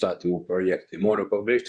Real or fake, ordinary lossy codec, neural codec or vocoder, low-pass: fake; Opus, 64 kbps; codec, 24 kHz, 0.9 kbps, WavTokenizer, medium speech release version 2; 10.8 kHz